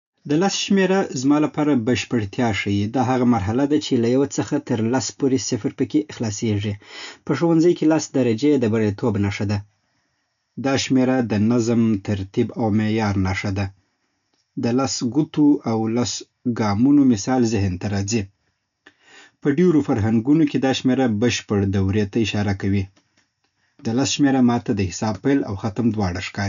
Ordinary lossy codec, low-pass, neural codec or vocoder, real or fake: none; 7.2 kHz; none; real